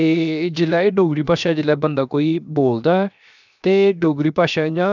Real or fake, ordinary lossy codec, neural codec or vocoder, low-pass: fake; none; codec, 16 kHz, 0.7 kbps, FocalCodec; 7.2 kHz